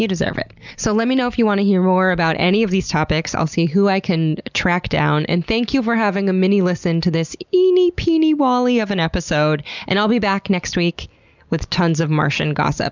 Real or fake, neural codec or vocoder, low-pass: real; none; 7.2 kHz